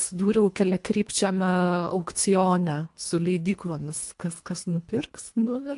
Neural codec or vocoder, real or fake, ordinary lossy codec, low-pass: codec, 24 kHz, 1.5 kbps, HILCodec; fake; AAC, 64 kbps; 10.8 kHz